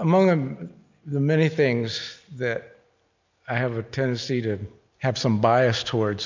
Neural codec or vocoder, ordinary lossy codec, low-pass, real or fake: none; MP3, 64 kbps; 7.2 kHz; real